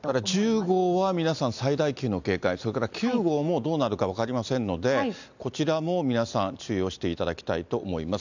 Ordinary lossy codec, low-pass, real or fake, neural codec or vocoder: none; 7.2 kHz; real; none